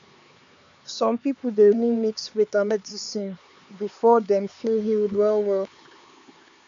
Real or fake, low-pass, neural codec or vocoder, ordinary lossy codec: fake; 7.2 kHz; codec, 16 kHz, 4 kbps, X-Codec, HuBERT features, trained on LibriSpeech; MP3, 64 kbps